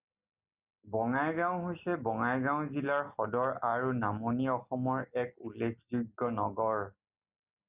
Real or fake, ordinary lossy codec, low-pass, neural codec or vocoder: real; Opus, 64 kbps; 3.6 kHz; none